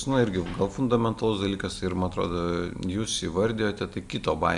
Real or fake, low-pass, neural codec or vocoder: real; 10.8 kHz; none